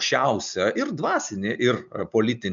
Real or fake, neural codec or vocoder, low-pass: real; none; 7.2 kHz